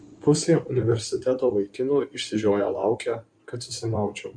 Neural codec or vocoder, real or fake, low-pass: codec, 16 kHz in and 24 kHz out, 2.2 kbps, FireRedTTS-2 codec; fake; 9.9 kHz